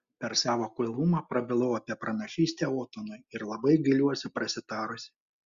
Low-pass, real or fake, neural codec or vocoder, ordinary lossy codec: 7.2 kHz; real; none; Opus, 64 kbps